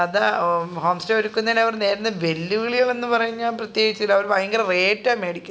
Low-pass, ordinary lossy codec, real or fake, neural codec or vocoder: none; none; real; none